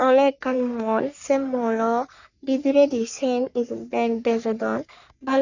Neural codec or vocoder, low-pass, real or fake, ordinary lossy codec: codec, 44.1 kHz, 3.4 kbps, Pupu-Codec; 7.2 kHz; fake; none